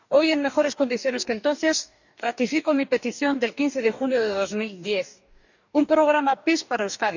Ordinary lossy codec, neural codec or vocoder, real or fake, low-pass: none; codec, 44.1 kHz, 2.6 kbps, DAC; fake; 7.2 kHz